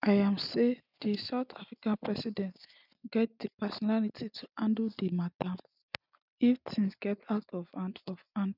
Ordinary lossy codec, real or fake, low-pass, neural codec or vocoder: none; real; 5.4 kHz; none